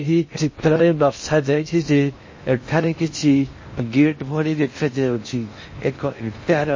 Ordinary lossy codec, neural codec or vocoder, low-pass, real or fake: MP3, 32 kbps; codec, 16 kHz in and 24 kHz out, 0.6 kbps, FocalCodec, streaming, 2048 codes; 7.2 kHz; fake